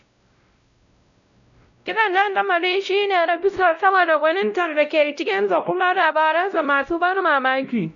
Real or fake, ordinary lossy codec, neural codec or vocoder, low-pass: fake; none; codec, 16 kHz, 0.5 kbps, X-Codec, WavLM features, trained on Multilingual LibriSpeech; 7.2 kHz